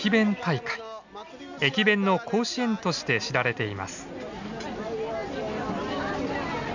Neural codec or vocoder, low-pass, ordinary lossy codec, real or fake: none; 7.2 kHz; none; real